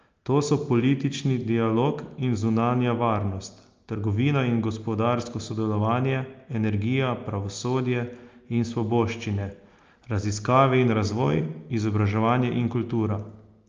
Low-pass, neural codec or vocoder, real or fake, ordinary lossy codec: 7.2 kHz; none; real; Opus, 24 kbps